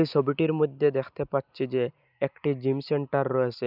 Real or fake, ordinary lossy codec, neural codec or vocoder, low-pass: real; none; none; 5.4 kHz